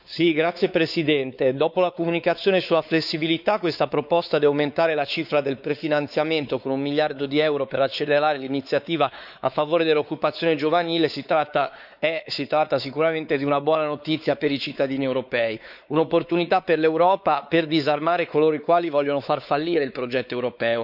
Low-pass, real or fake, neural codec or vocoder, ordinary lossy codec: 5.4 kHz; fake; codec, 16 kHz, 4 kbps, X-Codec, WavLM features, trained on Multilingual LibriSpeech; none